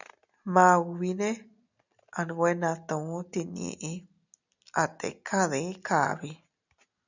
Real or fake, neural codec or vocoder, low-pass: real; none; 7.2 kHz